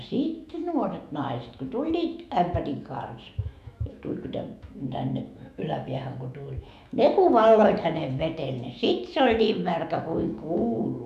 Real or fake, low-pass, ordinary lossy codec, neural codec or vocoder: fake; 14.4 kHz; none; autoencoder, 48 kHz, 128 numbers a frame, DAC-VAE, trained on Japanese speech